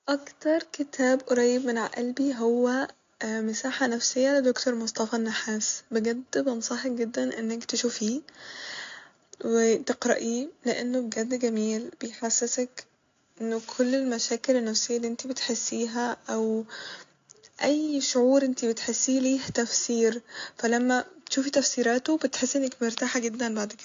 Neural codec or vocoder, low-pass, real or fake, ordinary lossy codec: none; 7.2 kHz; real; AAC, 48 kbps